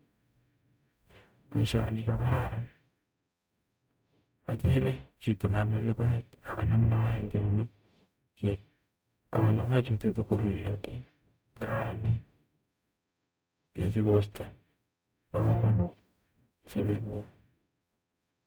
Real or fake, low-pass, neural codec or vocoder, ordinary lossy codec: fake; none; codec, 44.1 kHz, 0.9 kbps, DAC; none